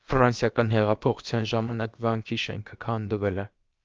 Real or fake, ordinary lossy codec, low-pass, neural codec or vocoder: fake; Opus, 24 kbps; 7.2 kHz; codec, 16 kHz, about 1 kbps, DyCAST, with the encoder's durations